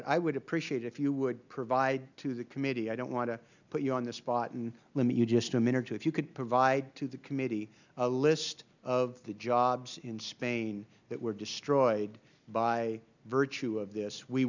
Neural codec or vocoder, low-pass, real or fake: none; 7.2 kHz; real